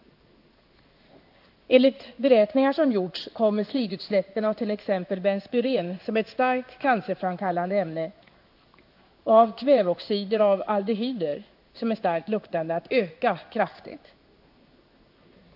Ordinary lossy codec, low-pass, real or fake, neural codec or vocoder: AAC, 48 kbps; 5.4 kHz; fake; codec, 16 kHz in and 24 kHz out, 1 kbps, XY-Tokenizer